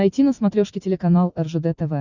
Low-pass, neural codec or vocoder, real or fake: 7.2 kHz; none; real